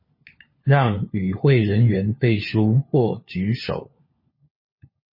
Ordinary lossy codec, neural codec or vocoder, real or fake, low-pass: MP3, 24 kbps; codec, 16 kHz, 4 kbps, FunCodec, trained on LibriTTS, 50 frames a second; fake; 5.4 kHz